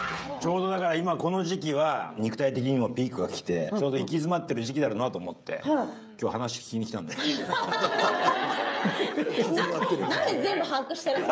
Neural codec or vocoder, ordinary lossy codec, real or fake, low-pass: codec, 16 kHz, 16 kbps, FreqCodec, smaller model; none; fake; none